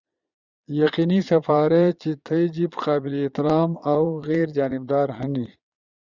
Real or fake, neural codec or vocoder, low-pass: fake; vocoder, 22.05 kHz, 80 mel bands, Vocos; 7.2 kHz